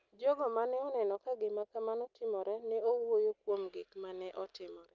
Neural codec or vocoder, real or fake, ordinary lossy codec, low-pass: none; real; Opus, 32 kbps; 7.2 kHz